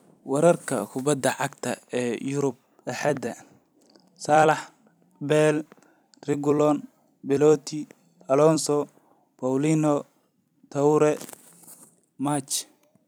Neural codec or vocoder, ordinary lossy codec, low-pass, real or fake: vocoder, 44.1 kHz, 128 mel bands every 256 samples, BigVGAN v2; none; none; fake